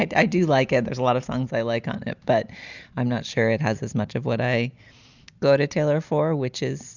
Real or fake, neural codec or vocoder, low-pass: real; none; 7.2 kHz